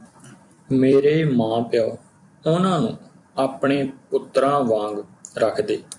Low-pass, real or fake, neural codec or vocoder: 10.8 kHz; fake; vocoder, 44.1 kHz, 128 mel bands every 256 samples, BigVGAN v2